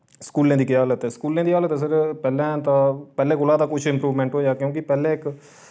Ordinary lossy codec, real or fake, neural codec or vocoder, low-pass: none; real; none; none